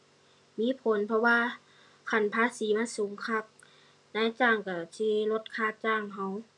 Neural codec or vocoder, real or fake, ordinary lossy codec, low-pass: none; real; none; 10.8 kHz